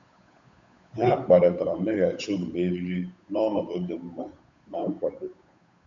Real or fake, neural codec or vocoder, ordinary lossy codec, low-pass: fake; codec, 16 kHz, 8 kbps, FunCodec, trained on Chinese and English, 25 frames a second; AAC, 64 kbps; 7.2 kHz